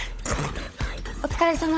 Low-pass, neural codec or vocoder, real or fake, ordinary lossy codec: none; codec, 16 kHz, 16 kbps, FunCodec, trained on LibriTTS, 50 frames a second; fake; none